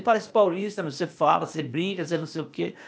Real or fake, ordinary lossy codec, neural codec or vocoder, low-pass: fake; none; codec, 16 kHz, 0.8 kbps, ZipCodec; none